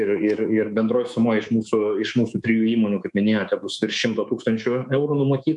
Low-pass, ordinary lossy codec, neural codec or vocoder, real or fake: 10.8 kHz; MP3, 64 kbps; codec, 24 kHz, 3.1 kbps, DualCodec; fake